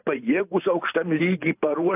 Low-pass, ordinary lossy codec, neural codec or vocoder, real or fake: 3.6 kHz; AAC, 24 kbps; vocoder, 44.1 kHz, 128 mel bands every 512 samples, BigVGAN v2; fake